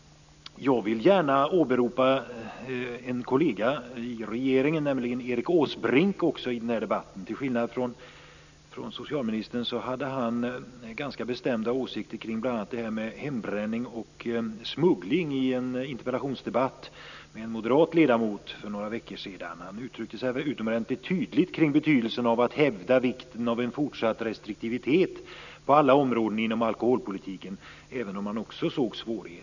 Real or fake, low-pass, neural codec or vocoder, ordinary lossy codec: real; 7.2 kHz; none; none